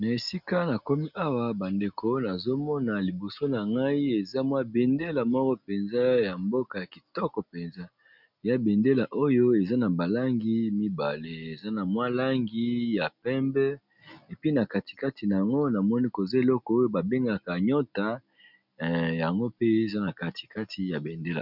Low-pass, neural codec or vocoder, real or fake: 5.4 kHz; none; real